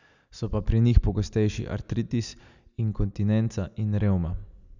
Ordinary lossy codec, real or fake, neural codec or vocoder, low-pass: none; real; none; 7.2 kHz